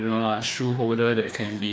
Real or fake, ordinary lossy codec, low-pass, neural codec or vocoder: fake; none; none; codec, 16 kHz, 2 kbps, FreqCodec, larger model